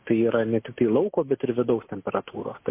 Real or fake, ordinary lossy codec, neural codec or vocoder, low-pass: real; MP3, 24 kbps; none; 3.6 kHz